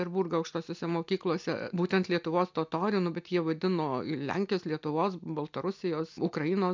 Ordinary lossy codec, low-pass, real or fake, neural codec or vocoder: MP3, 64 kbps; 7.2 kHz; real; none